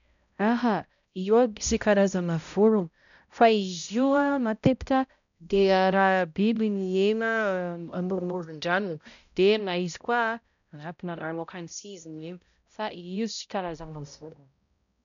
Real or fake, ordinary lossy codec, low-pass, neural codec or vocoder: fake; none; 7.2 kHz; codec, 16 kHz, 0.5 kbps, X-Codec, HuBERT features, trained on balanced general audio